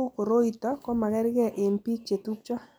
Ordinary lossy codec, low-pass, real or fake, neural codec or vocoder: none; none; real; none